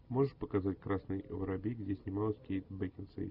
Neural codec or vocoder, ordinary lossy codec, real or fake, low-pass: none; Opus, 64 kbps; real; 5.4 kHz